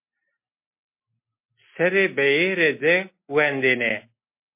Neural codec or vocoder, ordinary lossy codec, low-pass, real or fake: none; MP3, 24 kbps; 3.6 kHz; real